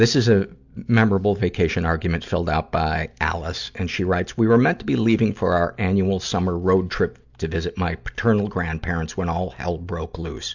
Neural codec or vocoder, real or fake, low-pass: none; real; 7.2 kHz